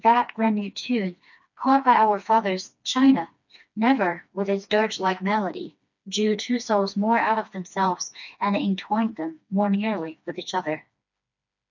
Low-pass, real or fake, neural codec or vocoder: 7.2 kHz; fake; codec, 16 kHz, 2 kbps, FreqCodec, smaller model